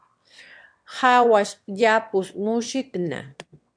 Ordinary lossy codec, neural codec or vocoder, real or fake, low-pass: MP3, 64 kbps; autoencoder, 22.05 kHz, a latent of 192 numbers a frame, VITS, trained on one speaker; fake; 9.9 kHz